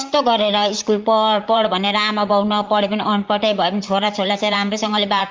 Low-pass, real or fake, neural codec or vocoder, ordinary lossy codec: 7.2 kHz; fake; vocoder, 44.1 kHz, 128 mel bands, Pupu-Vocoder; Opus, 24 kbps